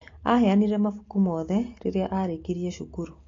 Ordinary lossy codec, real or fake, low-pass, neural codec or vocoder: AAC, 32 kbps; real; 7.2 kHz; none